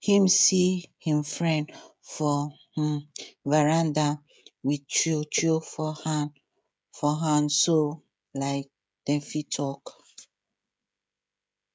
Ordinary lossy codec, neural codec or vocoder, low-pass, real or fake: none; codec, 16 kHz, 16 kbps, FreqCodec, smaller model; none; fake